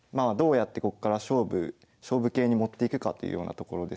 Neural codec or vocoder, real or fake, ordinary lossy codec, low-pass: none; real; none; none